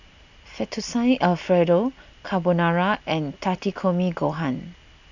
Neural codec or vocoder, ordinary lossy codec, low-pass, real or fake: none; none; 7.2 kHz; real